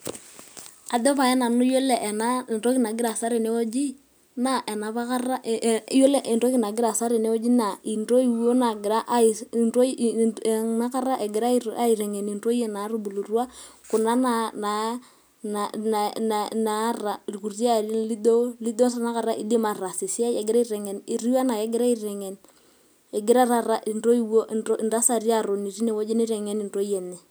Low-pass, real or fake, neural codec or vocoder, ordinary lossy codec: none; real; none; none